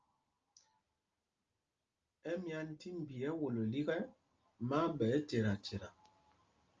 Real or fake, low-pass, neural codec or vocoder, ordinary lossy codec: real; 7.2 kHz; none; Opus, 24 kbps